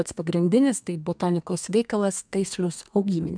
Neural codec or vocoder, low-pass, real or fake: codec, 32 kHz, 1.9 kbps, SNAC; 9.9 kHz; fake